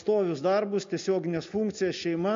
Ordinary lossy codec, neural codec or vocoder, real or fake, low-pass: MP3, 48 kbps; none; real; 7.2 kHz